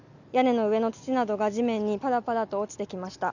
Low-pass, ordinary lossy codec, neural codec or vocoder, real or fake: 7.2 kHz; none; none; real